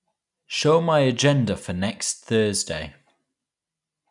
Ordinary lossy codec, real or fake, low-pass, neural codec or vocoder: none; real; 10.8 kHz; none